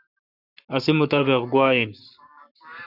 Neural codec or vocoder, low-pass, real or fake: codec, 44.1 kHz, 7.8 kbps, Pupu-Codec; 5.4 kHz; fake